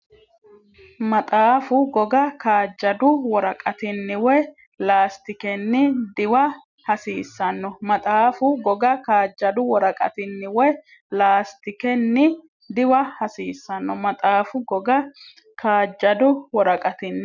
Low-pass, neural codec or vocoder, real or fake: 7.2 kHz; none; real